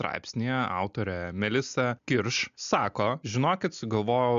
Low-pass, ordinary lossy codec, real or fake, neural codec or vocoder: 7.2 kHz; MP3, 64 kbps; real; none